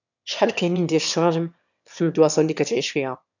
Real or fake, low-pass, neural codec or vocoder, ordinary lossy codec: fake; 7.2 kHz; autoencoder, 22.05 kHz, a latent of 192 numbers a frame, VITS, trained on one speaker; none